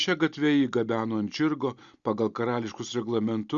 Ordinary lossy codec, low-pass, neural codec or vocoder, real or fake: Opus, 64 kbps; 7.2 kHz; none; real